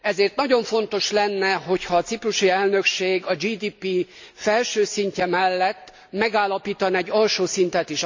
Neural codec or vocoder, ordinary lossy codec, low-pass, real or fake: none; MP3, 64 kbps; 7.2 kHz; real